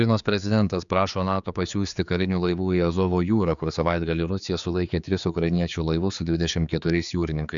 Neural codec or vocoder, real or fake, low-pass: codec, 16 kHz, 4 kbps, X-Codec, HuBERT features, trained on general audio; fake; 7.2 kHz